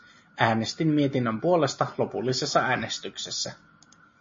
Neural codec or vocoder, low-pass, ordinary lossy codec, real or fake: none; 7.2 kHz; MP3, 32 kbps; real